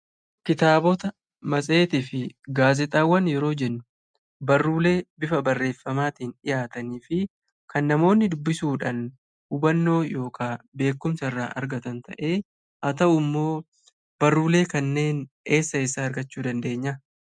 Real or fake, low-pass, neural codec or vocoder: real; 9.9 kHz; none